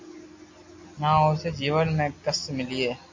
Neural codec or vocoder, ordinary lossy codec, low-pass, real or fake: none; MP3, 48 kbps; 7.2 kHz; real